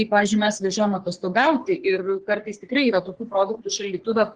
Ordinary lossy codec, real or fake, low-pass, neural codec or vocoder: Opus, 16 kbps; fake; 9.9 kHz; codec, 44.1 kHz, 3.4 kbps, Pupu-Codec